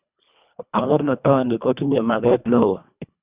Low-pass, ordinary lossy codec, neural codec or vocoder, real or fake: 3.6 kHz; Opus, 64 kbps; codec, 24 kHz, 1.5 kbps, HILCodec; fake